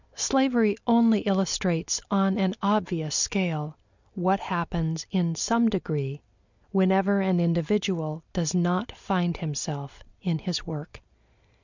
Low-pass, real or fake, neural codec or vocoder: 7.2 kHz; real; none